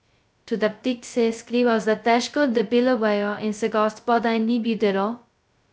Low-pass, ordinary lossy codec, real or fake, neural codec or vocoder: none; none; fake; codec, 16 kHz, 0.2 kbps, FocalCodec